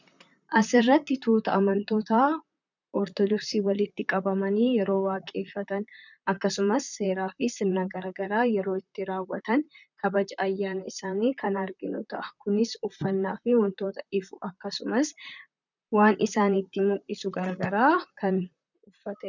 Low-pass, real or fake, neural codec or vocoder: 7.2 kHz; fake; vocoder, 44.1 kHz, 128 mel bands, Pupu-Vocoder